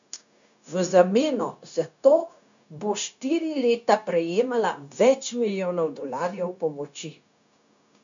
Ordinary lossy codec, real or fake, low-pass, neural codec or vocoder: none; fake; 7.2 kHz; codec, 16 kHz, 0.9 kbps, LongCat-Audio-Codec